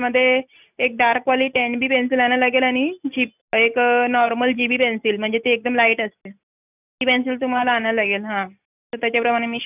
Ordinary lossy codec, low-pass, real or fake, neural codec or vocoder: none; 3.6 kHz; real; none